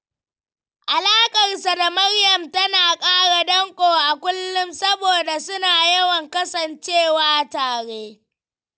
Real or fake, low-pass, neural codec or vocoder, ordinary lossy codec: real; none; none; none